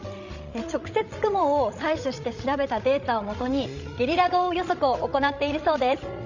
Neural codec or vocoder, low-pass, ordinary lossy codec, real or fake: codec, 16 kHz, 16 kbps, FreqCodec, larger model; 7.2 kHz; none; fake